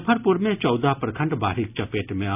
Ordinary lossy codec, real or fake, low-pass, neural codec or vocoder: none; real; 3.6 kHz; none